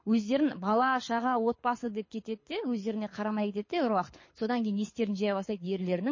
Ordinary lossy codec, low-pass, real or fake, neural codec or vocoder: MP3, 32 kbps; 7.2 kHz; fake; codec, 24 kHz, 6 kbps, HILCodec